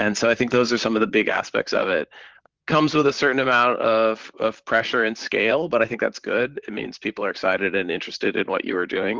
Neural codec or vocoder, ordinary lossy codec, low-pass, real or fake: vocoder, 44.1 kHz, 128 mel bands, Pupu-Vocoder; Opus, 16 kbps; 7.2 kHz; fake